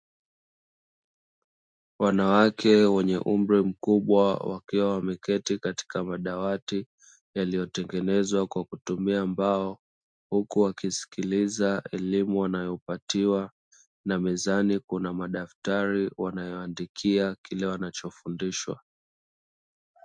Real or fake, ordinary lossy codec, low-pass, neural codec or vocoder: real; MP3, 64 kbps; 9.9 kHz; none